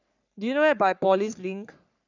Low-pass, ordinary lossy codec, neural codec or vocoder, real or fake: 7.2 kHz; none; codec, 44.1 kHz, 3.4 kbps, Pupu-Codec; fake